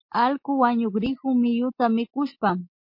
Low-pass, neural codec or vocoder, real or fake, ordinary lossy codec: 5.4 kHz; none; real; MP3, 32 kbps